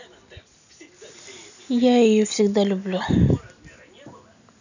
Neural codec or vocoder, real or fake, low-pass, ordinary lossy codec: none; real; 7.2 kHz; none